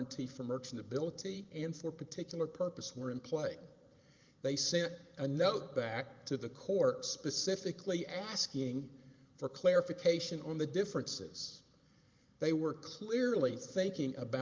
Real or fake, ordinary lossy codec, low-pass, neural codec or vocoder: fake; Opus, 24 kbps; 7.2 kHz; vocoder, 44.1 kHz, 128 mel bands every 512 samples, BigVGAN v2